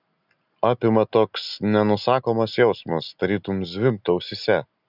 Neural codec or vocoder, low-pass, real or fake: none; 5.4 kHz; real